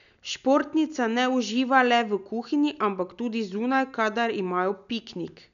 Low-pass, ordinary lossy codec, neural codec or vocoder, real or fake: 7.2 kHz; none; none; real